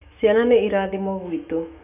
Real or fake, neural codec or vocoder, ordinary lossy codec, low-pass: fake; autoencoder, 48 kHz, 128 numbers a frame, DAC-VAE, trained on Japanese speech; none; 3.6 kHz